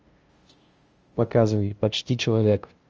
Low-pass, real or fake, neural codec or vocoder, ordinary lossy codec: 7.2 kHz; fake; codec, 16 kHz, 0.5 kbps, FunCodec, trained on LibriTTS, 25 frames a second; Opus, 24 kbps